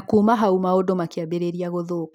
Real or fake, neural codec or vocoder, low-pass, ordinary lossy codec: real; none; 19.8 kHz; none